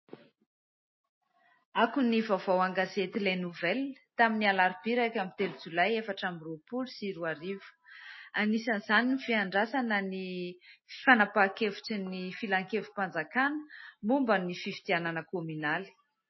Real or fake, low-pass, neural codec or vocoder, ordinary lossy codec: real; 7.2 kHz; none; MP3, 24 kbps